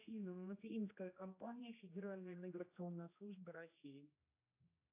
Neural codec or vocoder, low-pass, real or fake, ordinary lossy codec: codec, 16 kHz, 1 kbps, X-Codec, HuBERT features, trained on general audio; 3.6 kHz; fake; AAC, 24 kbps